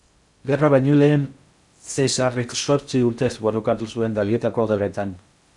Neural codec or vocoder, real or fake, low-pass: codec, 16 kHz in and 24 kHz out, 0.6 kbps, FocalCodec, streaming, 4096 codes; fake; 10.8 kHz